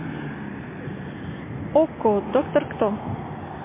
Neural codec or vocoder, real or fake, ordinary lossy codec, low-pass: none; real; MP3, 16 kbps; 3.6 kHz